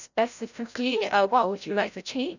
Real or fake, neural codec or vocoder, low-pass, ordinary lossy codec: fake; codec, 16 kHz, 0.5 kbps, FreqCodec, larger model; 7.2 kHz; none